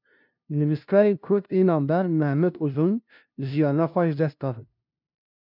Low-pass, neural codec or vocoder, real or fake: 5.4 kHz; codec, 16 kHz, 0.5 kbps, FunCodec, trained on LibriTTS, 25 frames a second; fake